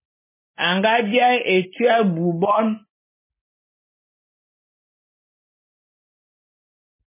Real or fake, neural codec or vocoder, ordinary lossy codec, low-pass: real; none; MP3, 16 kbps; 3.6 kHz